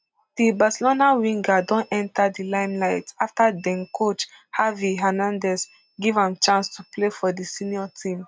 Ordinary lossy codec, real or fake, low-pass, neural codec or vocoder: none; real; none; none